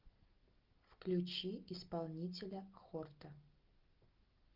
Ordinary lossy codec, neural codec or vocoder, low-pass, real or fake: Opus, 24 kbps; none; 5.4 kHz; real